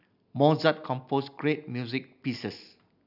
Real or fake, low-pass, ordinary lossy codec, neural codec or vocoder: real; 5.4 kHz; none; none